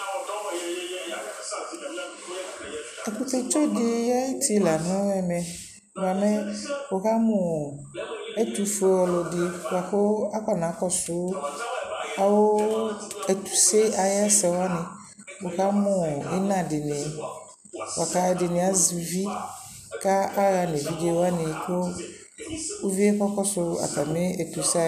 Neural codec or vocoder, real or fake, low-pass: none; real; 14.4 kHz